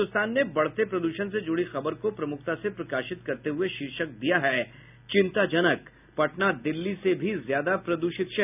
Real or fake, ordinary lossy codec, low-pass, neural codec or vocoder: real; none; 3.6 kHz; none